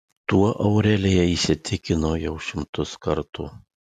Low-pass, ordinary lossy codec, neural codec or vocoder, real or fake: 14.4 kHz; MP3, 96 kbps; none; real